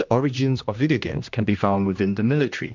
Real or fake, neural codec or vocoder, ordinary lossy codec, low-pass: fake; codec, 16 kHz, 1 kbps, X-Codec, HuBERT features, trained on general audio; MP3, 64 kbps; 7.2 kHz